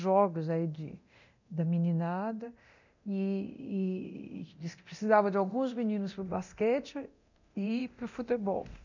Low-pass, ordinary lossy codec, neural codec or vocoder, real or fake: 7.2 kHz; none; codec, 24 kHz, 0.9 kbps, DualCodec; fake